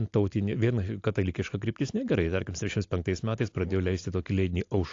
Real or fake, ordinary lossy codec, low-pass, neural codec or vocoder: real; AAC, 48 kbps; 7.2 kHz; none